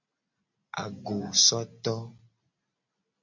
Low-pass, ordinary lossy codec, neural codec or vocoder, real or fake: 7.2 kHz; MP3, 48 kbps; none; real